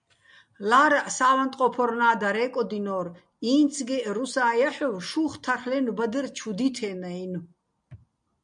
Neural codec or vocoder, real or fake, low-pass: none; real; 9.9 kHz